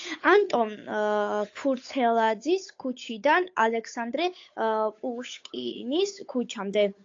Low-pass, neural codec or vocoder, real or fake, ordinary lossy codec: 7.2 kHz; codec, 16 kHz, 8 kbps, FunCodec, trained on Chinese and English, 25 frames a second; fake; AAC, 48 kbps